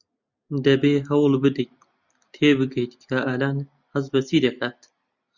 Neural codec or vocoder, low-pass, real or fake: none; 7.2 kHz; real